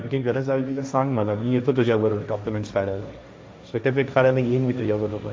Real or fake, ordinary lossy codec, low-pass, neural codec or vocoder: fake; none; none; codec, 16 kHz, 1.1 kbps, Voila-Tokenizer